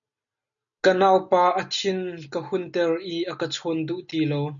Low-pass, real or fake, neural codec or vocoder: 7.2 kHz; real; none